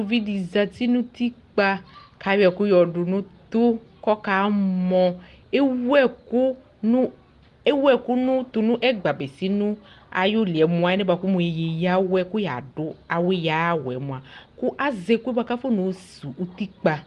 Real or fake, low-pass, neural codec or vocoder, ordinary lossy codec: real; 10.8 kHz; none; Opus, 24 kbps